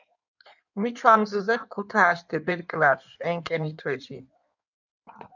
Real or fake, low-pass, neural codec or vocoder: fake; 7.2 kHz; codec, 16 kHz in and 24 kHz out, 1.1 kbps, FireRedTTS-2 codec